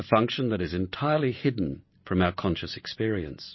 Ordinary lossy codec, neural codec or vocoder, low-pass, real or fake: MP3, 24 kbps; none; 7.2 kHz; real